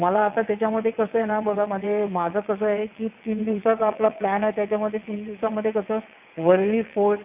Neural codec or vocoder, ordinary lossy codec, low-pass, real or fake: vocoder, 22.05 kHz, 80 mel bands, WaveNeXt; AAC, 32 kbps; 3.6 kHz; fake